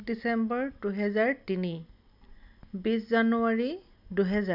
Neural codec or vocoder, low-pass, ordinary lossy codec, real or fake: none; 5.4 kHz; none; real